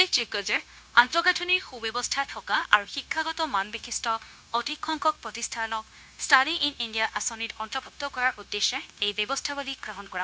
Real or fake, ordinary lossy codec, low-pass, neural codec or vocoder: fake; none; none; codec, 16 kHz, 0.9 kbps, LongCat-Audio-Codec